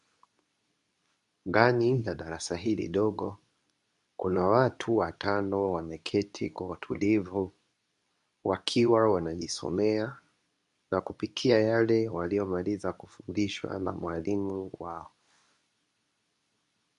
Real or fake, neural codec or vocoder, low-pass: fake; codec, 24 kHz, 0.9 kbps, WavTokenizer, medium speech release version 2; 10.8 kHz